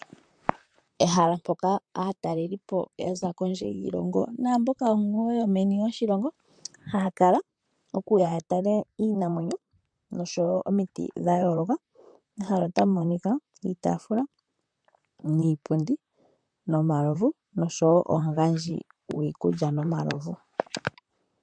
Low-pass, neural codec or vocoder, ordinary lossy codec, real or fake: 9.9 kHz; vocoder, 44.1 kHz, 128 mel bands, Pupu-Vocoder; MP3, 64 kbps; fake